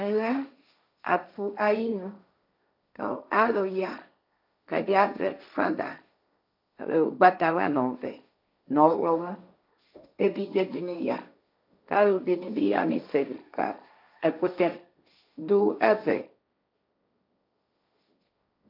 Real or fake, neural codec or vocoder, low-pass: fake; codec, 16 kHz, 1.1 kbps, Voila-Tokenizer; 5.4 kHz